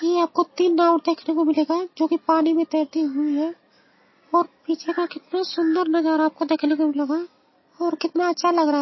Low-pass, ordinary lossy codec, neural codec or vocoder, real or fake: 7.2 kHz; MP3, 24 kbps; none; real